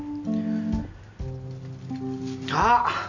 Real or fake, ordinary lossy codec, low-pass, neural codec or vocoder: real; none; 7.2 kHz; none